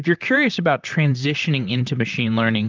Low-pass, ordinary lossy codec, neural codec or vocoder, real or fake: 7.2 kHz; Opus, 24 kbps; vocoder, 44.1 kHz, 128 mel bands, Pupu-Vocoder; fake